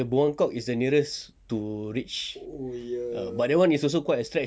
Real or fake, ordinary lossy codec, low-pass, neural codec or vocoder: real; none; none; none